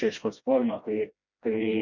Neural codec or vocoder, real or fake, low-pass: codec, 16 kHz, 1 kbps, FreqCodec, smaller model; fake; 7.2 kHz